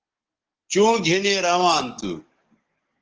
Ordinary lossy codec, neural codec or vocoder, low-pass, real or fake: Opus, 16 kbps; codec, 44.1 kHz, 7.8 kbps, DAC; 7.2 kHz; fake